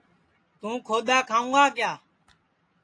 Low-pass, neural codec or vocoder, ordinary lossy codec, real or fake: 9.9 kHz; none; AAC, 48 kbps; real